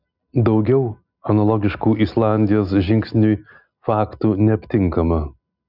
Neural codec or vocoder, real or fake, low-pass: none; real; 5.4 kHz